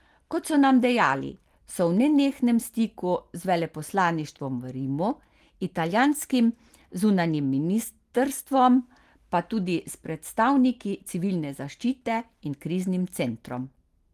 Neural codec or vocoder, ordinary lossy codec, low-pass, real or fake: none; Opus, 24 kbps; 14.4 kHz; real